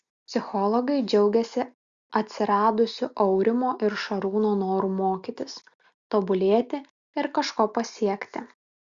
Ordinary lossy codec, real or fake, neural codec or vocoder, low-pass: Opus, 64 kbps; real; none; 7.2 kHz